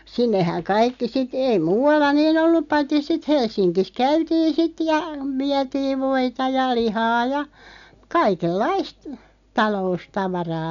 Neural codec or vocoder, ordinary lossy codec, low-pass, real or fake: none; none; 7.2 kHz; real